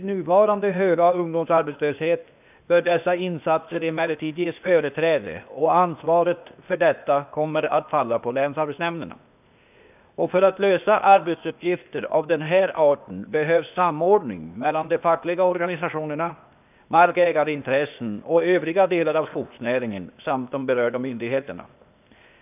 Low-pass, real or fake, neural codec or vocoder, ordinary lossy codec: 3.6 kHz; fake; codec, 16 kHz, 0.8 kbps, ZipCodec; none